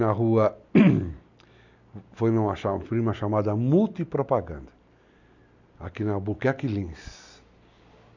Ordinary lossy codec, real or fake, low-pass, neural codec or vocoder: none; real; 7.2 kHz; none